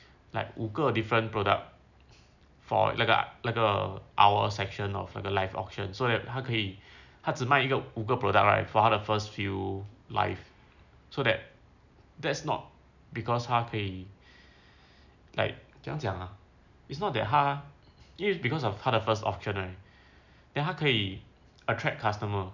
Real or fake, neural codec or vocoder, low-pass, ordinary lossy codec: real; none; 7.2 kHz; none